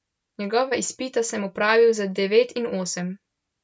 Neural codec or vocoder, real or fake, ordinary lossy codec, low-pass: none; real; none; none